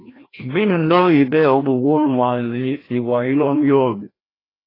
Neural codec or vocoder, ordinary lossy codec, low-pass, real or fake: codec, 16 kHz, 1 kbps, FreqCodec, larger model; AAC, 24 kbps; 5.4 kHz; fake